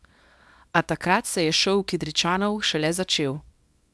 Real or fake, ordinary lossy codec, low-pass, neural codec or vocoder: fake; none; none; codec, 24 kHz, 0.9 kbps, WavTokenizer, small release